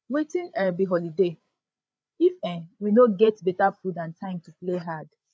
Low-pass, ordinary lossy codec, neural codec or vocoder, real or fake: none; none; codec, 16 kHz, 8 kbps, FreqCodec, larger model; fake